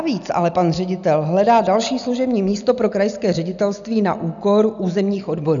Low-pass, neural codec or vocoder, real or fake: 7.2 kHz; none; real